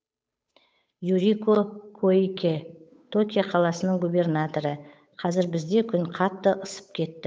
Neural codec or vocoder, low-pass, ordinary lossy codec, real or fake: codec, 16 kHz, 8 kbps, FunCodec, trained on Chinese and English, 25 frames a second; none; none; fake